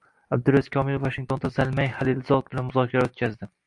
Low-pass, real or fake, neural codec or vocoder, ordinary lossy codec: 10.8 kHz; real; none; AAC, 64 kbps